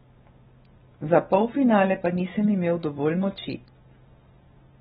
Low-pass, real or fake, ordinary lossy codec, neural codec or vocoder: 7.2 kHz; real; AAC, 16 kbps; none